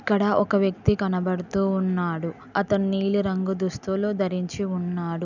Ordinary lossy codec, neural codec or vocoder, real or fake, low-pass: none; none; real; 7.2 kHz